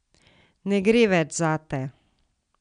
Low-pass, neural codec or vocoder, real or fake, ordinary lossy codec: 9.9 kHz; none; real; none